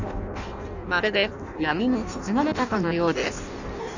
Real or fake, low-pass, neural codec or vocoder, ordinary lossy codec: fake; 7.2 kHz; codec, 16 kHz in and 24 kHz out, 0.6 kbps, FireRedTTS-2 codec; none